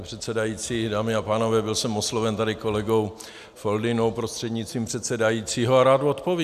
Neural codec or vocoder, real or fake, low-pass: none; real; 14.4 kHz